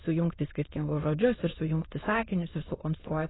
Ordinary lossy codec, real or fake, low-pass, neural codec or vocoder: AAC, 16 kbps; fake; 7.2 kHz; autoencoder, 22.05 kHz, a latent of 192 numbers a frame, VITS, trained on many speakers